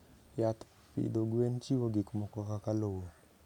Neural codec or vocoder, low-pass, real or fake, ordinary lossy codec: none; 19.8 kHz; real; none